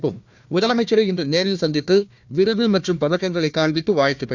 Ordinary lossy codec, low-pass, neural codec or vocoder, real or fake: none; 7.2 kHz; codec, 16 kHz, 1 kbps, FunCodec, trained on Chinese and English, 50 frames a second; fake